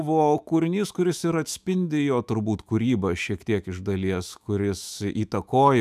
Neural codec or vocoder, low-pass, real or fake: autoencoder, 48 kHz, 128 numbers a frame, DAC-VAE, trained on Japanese speech; 14.4 kHz; fake